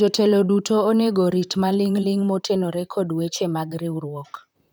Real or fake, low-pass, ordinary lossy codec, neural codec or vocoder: fake; none; none; vocoder, 44.1 kHz, 128 mel bands, Pupu-Vocoder